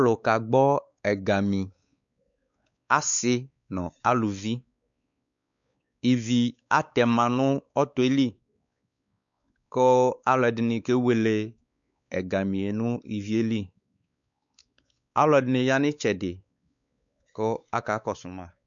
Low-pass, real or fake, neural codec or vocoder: 7.2 kHz; fake; codec, 16 kHz, 4 kbps, X-Codec, WavLM features, trained on Multilingual LibriSpeech